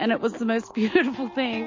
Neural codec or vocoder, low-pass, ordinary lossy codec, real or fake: none; 7.2 kHz; MP3, 32 kbps; real